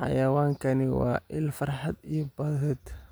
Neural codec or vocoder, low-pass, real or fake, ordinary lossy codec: none; none; real; none